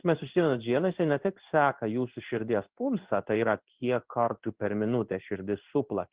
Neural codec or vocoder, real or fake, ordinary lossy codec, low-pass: codec, 16 kHz in and 24 kHz out, 1 kbps, XY-Tokenizer; fake; Opus, 16 kbps; 3.6 kHz